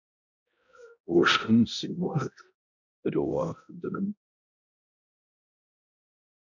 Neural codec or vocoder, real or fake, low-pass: codec, 16 kHz, 0.5 kbps, X-Codec, HuBERT features, trained on balanced general audio; fake; 7.2 kHz